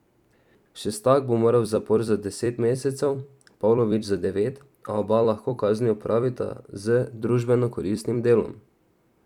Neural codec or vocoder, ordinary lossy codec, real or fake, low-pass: vocoder, 44.1 kHz, 128 mel bands every 256 samples, BigVGAN v2; none; fake; 19.8 kHz